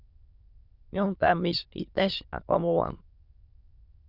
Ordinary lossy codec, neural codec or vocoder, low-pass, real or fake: Opus, 64 kbps; autoencoder, 22.05 kHz, a latent of 192 numbers a frame, VITS, trained on many speakers; 5.4 kHz; fake